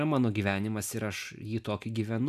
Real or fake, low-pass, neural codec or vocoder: real; 14.4 kHz; none